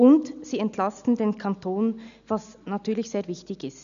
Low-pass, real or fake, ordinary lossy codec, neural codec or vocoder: 7.2 kHz; real; none; none